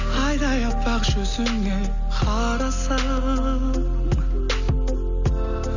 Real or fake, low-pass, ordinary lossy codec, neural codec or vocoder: real; 7.2 kHz; none; none